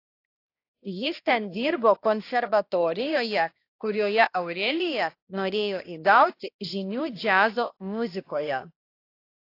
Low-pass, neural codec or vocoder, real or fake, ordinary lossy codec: 5.4 kHz; codec, 16 kHz, 1 kbps, X-Codec, HuBERT features, trained on balanced general audio; fake; AAC, 32 kbps